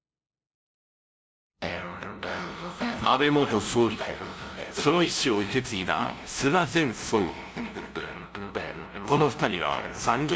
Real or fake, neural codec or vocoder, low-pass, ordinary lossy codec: fake; codec, 16 kHz, 0.5 kbps, FunCodec, trained on LibriTTS, 25 frames a second; none; none